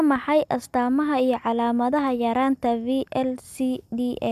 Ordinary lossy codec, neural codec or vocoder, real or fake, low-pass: none; none; real; 14.4 kHz